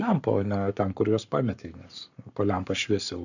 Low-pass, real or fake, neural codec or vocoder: 7.2 kHz; fake; codec, 44.1 kHz, 7.8 kbps, Pupu-Codec